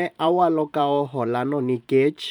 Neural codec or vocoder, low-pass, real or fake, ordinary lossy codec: vocoder, 44.1 kHz, 128 mel bands every 512 samples, BigVGAN v2; 19.8 kHz; fake; none